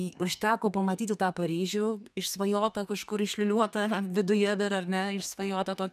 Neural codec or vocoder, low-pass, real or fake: codec, 32 kHz, 1.9 kbps, SNAC; 14.4 kHz; fake